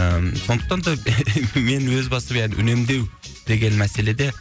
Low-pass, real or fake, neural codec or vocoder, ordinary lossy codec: none; real; none; none